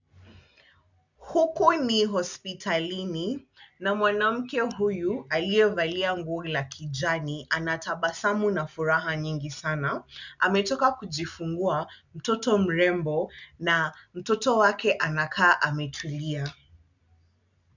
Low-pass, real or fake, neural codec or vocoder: 7.2 kHz; real; none